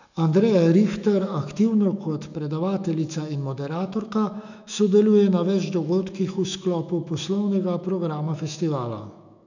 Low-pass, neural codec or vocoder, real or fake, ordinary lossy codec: 7.2 kHz; autoencoder, 48 kHz, 128 numbers a frame, DAC-VAE, trained on Japanese speech; fake; none